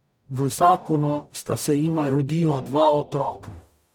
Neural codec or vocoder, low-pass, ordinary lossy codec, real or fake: codec, 44.1 kHz, 0.9 kbps, DAC; 19.8 kHz; none; fake